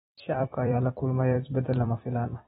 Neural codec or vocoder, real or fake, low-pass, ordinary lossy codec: vocoder, 44.1 kHz, 128 mel bands every 256 samples, BigVGAN v2; fake; 19.8 kHz; AAC, 16 kbps